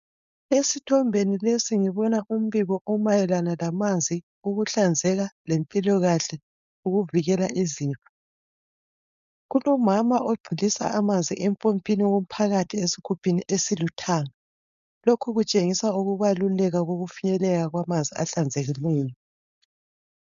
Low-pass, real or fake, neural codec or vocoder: 7.2 kHz; fake; codec, 16 kHz, 4.8 kbps, FACodec